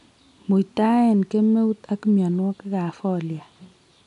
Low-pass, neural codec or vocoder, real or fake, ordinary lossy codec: 10.8 kHz; none; real; none